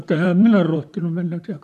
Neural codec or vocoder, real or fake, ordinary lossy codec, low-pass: vocoder, 44.1 kHz, 128 mel bands, Pupu-Vocoder; fake; none; 14.4 kHz